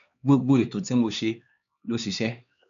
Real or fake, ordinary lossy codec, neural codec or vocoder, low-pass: fake; none; codec, 16 kHz, 2 kbps, X-Codec, HuBERT features, trained on LibriSpeech; 7.2 kHz